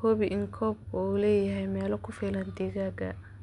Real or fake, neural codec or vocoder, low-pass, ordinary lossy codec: real; none; 10.8 kHz; none